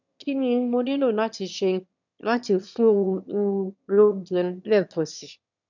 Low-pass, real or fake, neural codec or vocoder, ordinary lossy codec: 7.2 kHz; fake; autoencoder, 22.05 kHz, a latent of 192 numbers a frame, VITS, trained on one speaker; none